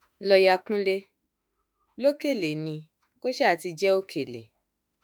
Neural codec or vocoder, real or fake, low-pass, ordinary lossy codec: autoencoder, 48 kHz, 32 numbers a frame, DAC-VAE, trained on Japanese speech; fake; none; none